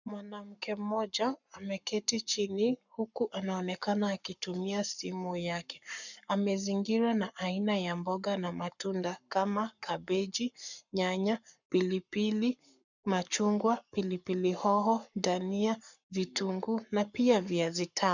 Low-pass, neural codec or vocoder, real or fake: 7.2 kHz; codec, 44.1 kHz, 7.8 kbps, Pupu-Codec; fake